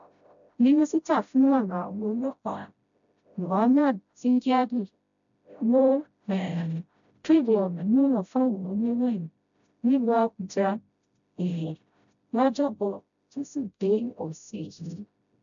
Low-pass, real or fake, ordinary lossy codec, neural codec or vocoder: 7.2 kHz; fake; none; codec, 16 kHz, 0.5 kbps, FreqCodec, smaller model